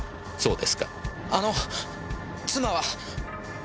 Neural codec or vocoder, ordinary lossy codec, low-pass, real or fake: none; none; none; real